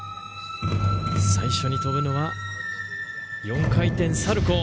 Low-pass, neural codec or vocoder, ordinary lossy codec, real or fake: none; none; none; real